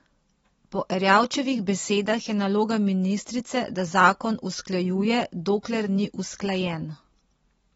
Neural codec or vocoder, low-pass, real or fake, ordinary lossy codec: none; 19.8 kHz; real; AAC, 24 kbps